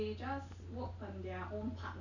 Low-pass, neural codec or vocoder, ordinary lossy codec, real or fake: 7.2 kHz; none; none; real